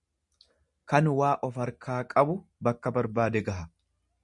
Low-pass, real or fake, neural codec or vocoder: 10.8 kHz; real; none